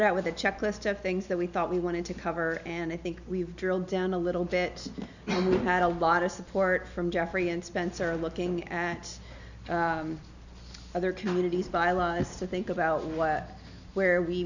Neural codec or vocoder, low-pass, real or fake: none; 7.2 kHz; real